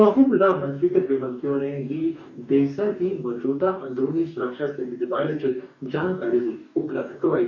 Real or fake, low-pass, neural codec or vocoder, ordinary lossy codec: fake; 7.2 kHz; codec, 44.1 kHz, 2.6 kbps, DAC; none